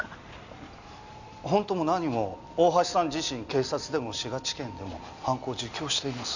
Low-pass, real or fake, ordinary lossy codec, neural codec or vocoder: 7.2 kHz; real; none; none